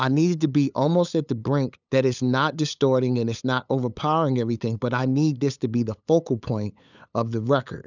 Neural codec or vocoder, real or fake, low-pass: codec, 16 kHz, 8 kbps, FunCodec, trained on LibriTTS, 25 frames a second; fake; 7.2 kHz